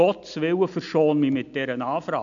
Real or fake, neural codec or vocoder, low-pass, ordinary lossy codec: real; none; 7.2 kHz; AAC, 64 kbps